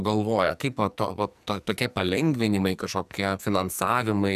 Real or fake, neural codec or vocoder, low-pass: fake; codec, 44.1 kHz, 2.6 kbps, SNAC; 14.4 kHz